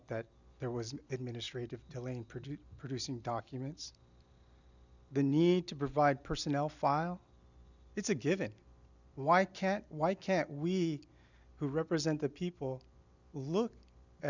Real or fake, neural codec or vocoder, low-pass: real; none; 7.2 kHz